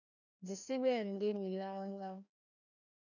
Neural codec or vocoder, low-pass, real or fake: codec, 16 kHz, 1 kbps, FreqCodec, larger model; 7.2 kHz; fake